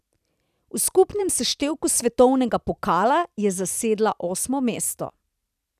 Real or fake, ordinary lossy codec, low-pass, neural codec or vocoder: fake; none; 14.4 kHz; vocoder, 44.1 kHz, 128 mel bands every 256 samples, BigVGAN v2